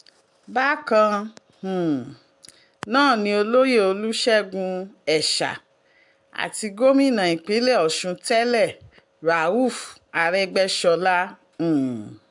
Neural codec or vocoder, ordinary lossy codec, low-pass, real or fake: none; MP3, 64 kbps; 10.8 kHz; real